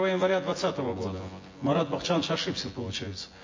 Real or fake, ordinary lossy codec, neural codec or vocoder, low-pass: fake; MP3, 32 kbps; vocoder, 24 kHz, 100 mel bands, Vocos; 7.2 kHz